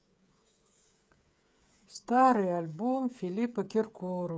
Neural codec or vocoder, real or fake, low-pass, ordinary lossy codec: codec, 16 kHz, 16 kbps, FreqCodec, smaller model; fake; none; none